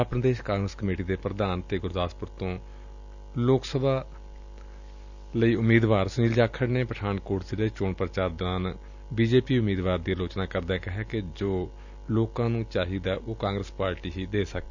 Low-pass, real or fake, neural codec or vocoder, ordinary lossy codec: 7.2 kHz; real; none; none